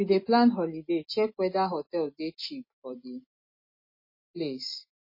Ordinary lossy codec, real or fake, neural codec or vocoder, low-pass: MP3, 24 kbps; real; none; 5.4 kHz